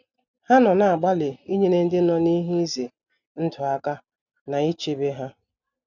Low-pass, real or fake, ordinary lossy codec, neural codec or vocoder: 7.2 kHz; real; none; none